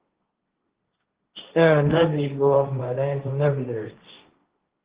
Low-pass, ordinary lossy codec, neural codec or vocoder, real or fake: 3.6 kHz; Opus, 16 kbps; codec, 16 kHz, 1.1 kbps, Voila-Tokenizer; fake